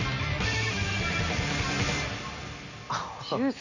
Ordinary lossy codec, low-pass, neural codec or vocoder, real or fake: none; 7.2 kHz; none; real